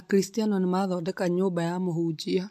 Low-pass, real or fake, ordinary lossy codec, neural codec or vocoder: 14.4 kHz; real; MP3, 64 kbps; none